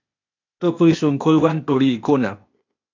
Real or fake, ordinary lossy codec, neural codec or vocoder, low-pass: fake; AAC, 48 kbps; codec, 16 kHz, 0.8 kbps, ZipCodec; 7.2 kHz